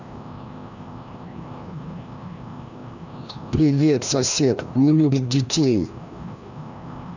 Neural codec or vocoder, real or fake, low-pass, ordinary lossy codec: codec, 16 kHz, 1 kbps, FreqCodec, larger model; fake; 7.2 kHz; none